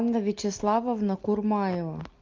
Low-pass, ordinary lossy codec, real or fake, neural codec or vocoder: 7.2 kHz; Opus, 24 kbps; real; none